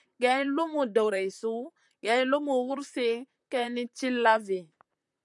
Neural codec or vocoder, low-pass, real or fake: vocoder, 44.1 kHz, 128 mel bands, Pupu-Vocoder; 10.8 kHz; fake